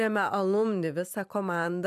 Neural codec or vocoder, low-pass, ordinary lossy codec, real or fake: vocoder, 44.1 kHz, 128 mel bands every 256 samples, BigVGAN v2; 14.4 kHz; MP3, 96 kbps; fake